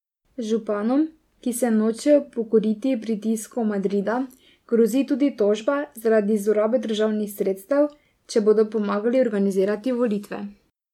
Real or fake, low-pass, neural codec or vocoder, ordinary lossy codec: real; 19.8 kHz; none; MP3, 96 kbps